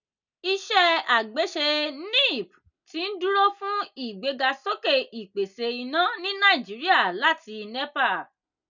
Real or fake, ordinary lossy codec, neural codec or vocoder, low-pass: real; none; none; 7.2 kHz